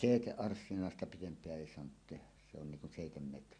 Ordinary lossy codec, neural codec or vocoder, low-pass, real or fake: none; none; 9.9 kHz; real